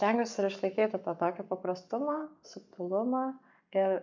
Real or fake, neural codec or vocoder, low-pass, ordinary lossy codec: fake; codec, 44.1 kHz, 7.8 kbps, Pupu-Codec; 7.2 kHz; MP3, 64 kbps